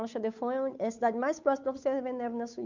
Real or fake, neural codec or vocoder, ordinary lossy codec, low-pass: fake; codec, 16 kHz, 8 kbps, FunCodec, trained on Chinese and English, 25 frames a second; none; 7.2 kHz